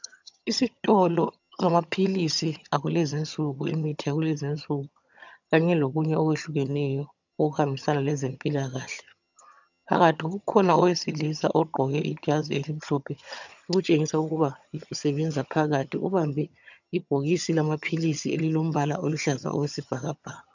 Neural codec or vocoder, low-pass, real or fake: vocoder, 22.05 kHz, 80 mel bands, HiFi-GAN; 7.2 kHz; fake